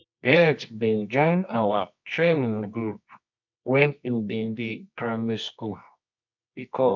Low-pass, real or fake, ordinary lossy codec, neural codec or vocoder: 7.2 kHz; fake; MP3, 64 kbps; codec, 24 kHz, 0.9 kbps, WavTokenizer, medium music audio release